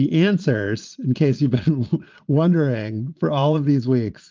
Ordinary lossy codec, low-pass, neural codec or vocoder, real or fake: Opus, 32 kbps; 7.2 kHz; vocoder, 22.05 kHz, 80 mel bands, Vocos; fake